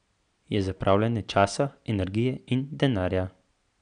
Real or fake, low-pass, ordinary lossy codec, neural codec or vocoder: real; 9.9 kHz; none; none